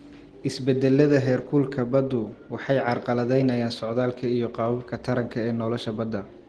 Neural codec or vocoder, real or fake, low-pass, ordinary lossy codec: none; real; 10.8 kHz; Opus, 16 kbps